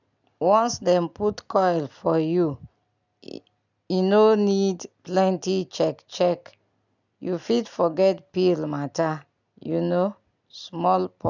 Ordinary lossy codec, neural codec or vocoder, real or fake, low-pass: none; none; real; 7.2 kHz